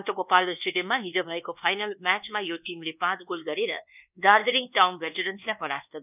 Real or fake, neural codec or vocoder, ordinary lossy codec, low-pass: fake; codec, 24 kHz, 1.2 kbps, DualCodec; none; 3.6 kHz